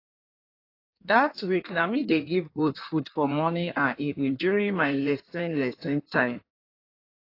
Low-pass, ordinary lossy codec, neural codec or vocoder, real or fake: 5.4 kHz; AAC, 32 kbps; codec, 16 kHz in and 24 kHz out, 1.1 kbps, FireRedTTS-2 codec; fake